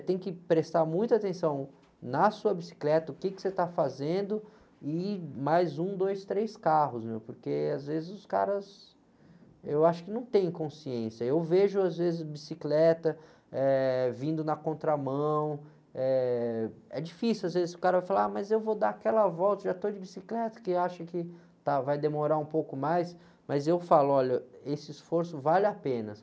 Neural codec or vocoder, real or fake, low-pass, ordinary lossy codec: none; real; none; none